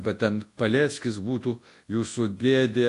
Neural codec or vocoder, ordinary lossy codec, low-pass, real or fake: codec, 24 kHz, 0.9 kbps, WavTokenizer, large speech release; AAC, 48 kbps; 10.8 kHz; fake